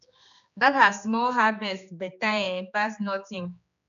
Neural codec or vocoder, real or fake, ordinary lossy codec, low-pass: codec, 16 kHz, 2 kbps, X-Codec, HuBERT features, trained on general audio; fake; none; 7.2 kHz